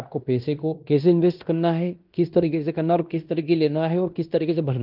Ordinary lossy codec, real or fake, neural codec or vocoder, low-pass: Opus, 24 kbps; fake; codec, 16 kHz in and 24 kHz out, 0.9 kbps, LongCat-Audio-Codec, fine tuned four codebook decoder; 5.4 kHz